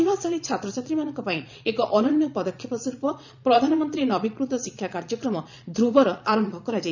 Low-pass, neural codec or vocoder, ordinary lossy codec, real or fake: 7.2 kHz; vocoder, 22.05 kHz, 80 mel bands, Vocos; none; fake